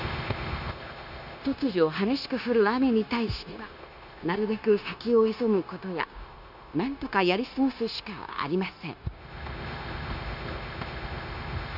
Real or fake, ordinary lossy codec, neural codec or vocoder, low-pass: fake; none; codec, 16 kHz, 0.9 kbps, LongCat-Audio-Codec; 5.4 kHz